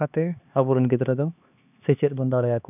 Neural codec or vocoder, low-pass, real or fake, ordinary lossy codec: codec, 16 kHz, 2 kbps, X-Codec, HuBERT features, trained on LibriSpeech; 3.6 kHz; fake; none